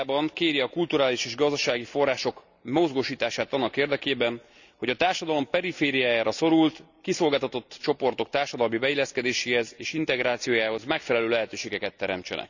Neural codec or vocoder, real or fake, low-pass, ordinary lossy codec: none; real; 7.2 kHz; none